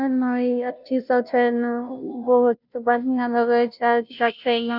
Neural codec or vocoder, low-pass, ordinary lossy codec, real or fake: codec, 16 kHz, 0.5 kbps, FunCodec, trained on Chinese and English, 25 frames a second; 5.4 kHz; none; fake